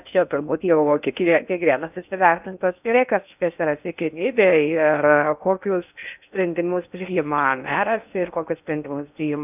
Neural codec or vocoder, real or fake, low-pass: codec, 16 kHz in and 24 kHz out, 0.6 kbps, FocalCodec, streaming, 4096 codes; fake; 3.6 kHz